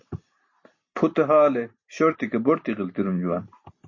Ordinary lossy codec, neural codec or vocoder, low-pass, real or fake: MP3, 32 kbps; none; 7.2 kHz; real